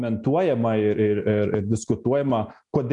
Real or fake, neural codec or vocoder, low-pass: real; none; 10.8 kHz